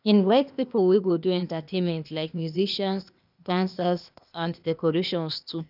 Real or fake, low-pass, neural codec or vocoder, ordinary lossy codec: fake; 5.4 kHz; codec, 16 kHz, 0.8 kbps, ZipCodec; none